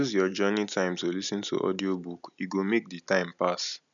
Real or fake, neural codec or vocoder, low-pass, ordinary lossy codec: real; none; 7.2 kHz; none